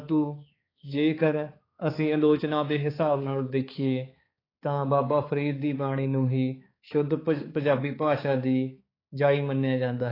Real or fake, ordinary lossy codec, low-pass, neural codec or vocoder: fake; AAC, 24 kbps; 5.4 kHz; codec, 16 kHz, 4 kbps, X-Codec, HuBERT features, trained on balanced general audio